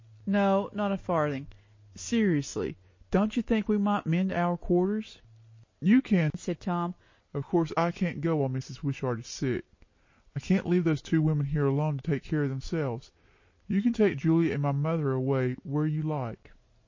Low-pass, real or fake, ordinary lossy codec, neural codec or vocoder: 7.2 kHz; real; MP3, 32 kbps; none